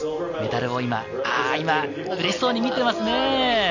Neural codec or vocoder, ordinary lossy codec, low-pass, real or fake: none; none; 7.2 kHz; real